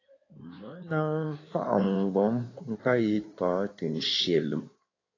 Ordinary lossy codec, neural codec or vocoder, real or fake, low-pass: AAC, 32 kbps; codec, 16 kHz in and 24 kHz out, 2.2 kbps, FireRedTTS-2 codec; fake; 7.2 kHz